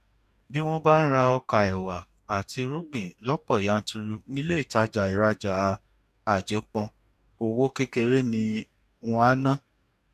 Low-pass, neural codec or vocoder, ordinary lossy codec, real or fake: 14.4 kHz; codec, 44.1 kHz, 2.6 kbps, DAC; none; fake